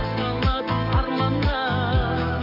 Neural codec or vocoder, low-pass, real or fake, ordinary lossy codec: codec, 44.1 kHz, 7.8 kbps, DAC; 5.4 kHz; fake; none